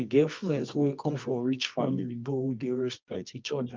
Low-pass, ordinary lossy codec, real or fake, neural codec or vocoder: 7.2 kHz; Opus, 24 kbps; fake; codec, 24 kHz, 0.9 kbps, WavTokenizer, medium music audio release